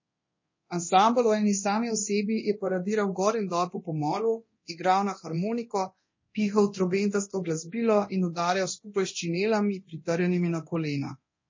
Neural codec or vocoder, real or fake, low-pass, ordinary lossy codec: codec, 24 kHz, 0.9 kbps, DualCodec; fake; 7.2 kHz; MP3, 32 kbps